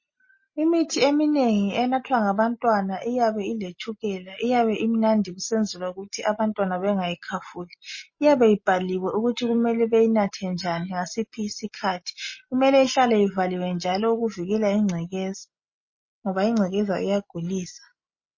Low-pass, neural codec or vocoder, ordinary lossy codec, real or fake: 7.2 kHz; none; MP3, 32 kbps; real